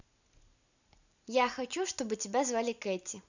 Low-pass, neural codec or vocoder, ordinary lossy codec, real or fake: 7.2 kHz; none; none; real